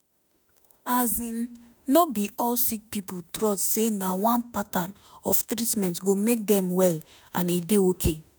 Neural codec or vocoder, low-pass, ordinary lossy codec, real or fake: autoencoder, 48 kHz, 32 numbers a frame, DAC-VAE, trained on Japanese speech; none; none; fake